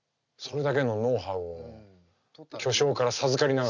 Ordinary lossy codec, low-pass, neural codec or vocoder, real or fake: none; 7.2 kHz; none; real